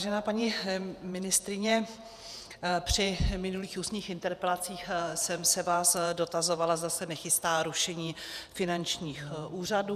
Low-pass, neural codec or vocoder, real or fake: 14.4 kHz; vocoder, 48 kHz, 128 mel bands, Vocos; fake